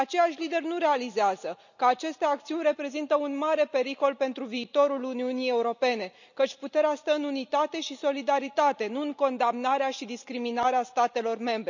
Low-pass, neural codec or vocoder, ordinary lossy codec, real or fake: 7.2 kHz; none; none; real